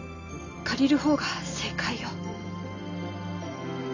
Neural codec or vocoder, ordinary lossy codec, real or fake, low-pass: none; none; real; 7.2 kHz